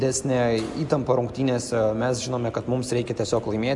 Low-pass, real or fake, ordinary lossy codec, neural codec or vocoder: 10.8 kHz; real; MP3, 96 kbps; none